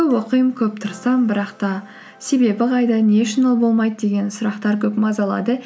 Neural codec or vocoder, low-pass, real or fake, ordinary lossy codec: none; none; real; none